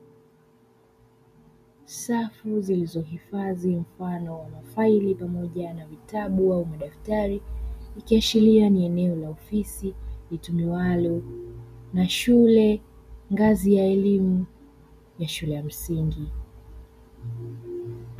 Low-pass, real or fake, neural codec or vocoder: 14.4 kHz; real; none